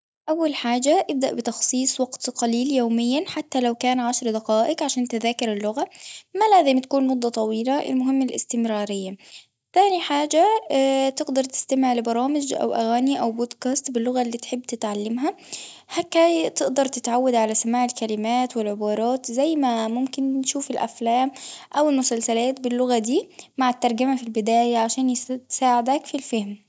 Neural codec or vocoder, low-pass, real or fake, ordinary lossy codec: none; none; real; none